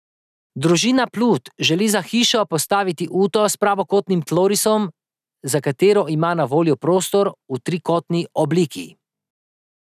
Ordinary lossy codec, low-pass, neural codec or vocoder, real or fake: none; 14.4 kHz; none; real